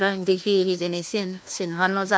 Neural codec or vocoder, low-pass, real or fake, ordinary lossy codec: codec, 16 kHz, 1 kbps, FunCodec, trained on LibriTTS, 50 frames a second; none; fake; none